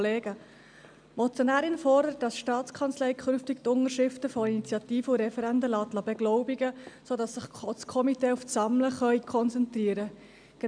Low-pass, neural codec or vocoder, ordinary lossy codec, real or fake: 9.9 kHz; none; MP3, 96 kbps; real